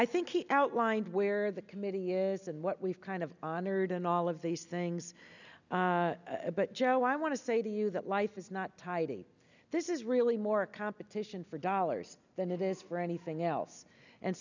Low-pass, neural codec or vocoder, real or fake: 7.2 kHz; none; real